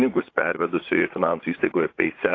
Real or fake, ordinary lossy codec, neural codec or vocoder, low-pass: fake; AAC, 32 kbps; vocoder, 44.1 kHz, 80 mel bands, Vocos; 7.2 kHz